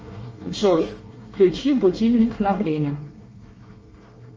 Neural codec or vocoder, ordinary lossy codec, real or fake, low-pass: codec, 24 kHz, 1 kbps, SNAC; Opus, 24 kbps; fake; 7.2 kHz